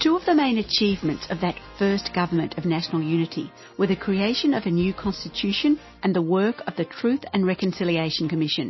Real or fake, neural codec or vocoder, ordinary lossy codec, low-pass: real; none; MP3, 24 kbps; 7.2 kHz